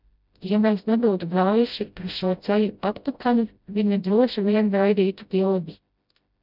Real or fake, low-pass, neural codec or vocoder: fake; 5.4 kHz; codec, 16 kHz, 0.5 kbps, FreqCodec, smaller model